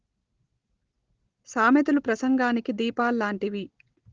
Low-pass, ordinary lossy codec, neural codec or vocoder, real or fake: 7.2 kHz; Opus, 16 kbps; none; real